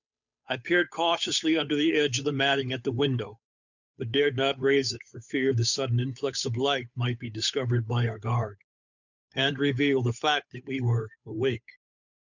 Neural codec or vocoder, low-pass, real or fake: codec, 16 kHz, 8 kbps, FunCodec, trained on Chinese and English, 25 frames a second; 7.2 kHz; fake